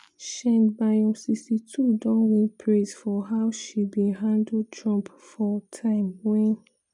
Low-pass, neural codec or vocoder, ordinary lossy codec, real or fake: 10.8 kHz; none; none; real